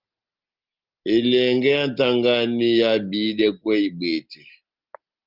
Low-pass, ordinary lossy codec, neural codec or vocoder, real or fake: 5.4 kHz; Opus, 32 kbps; none; real